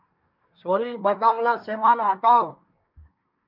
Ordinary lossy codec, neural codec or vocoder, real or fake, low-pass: MP3, 48 kbps; codec, 24 kHz, 1 kbps, SNAC; fake; 5.4 kHz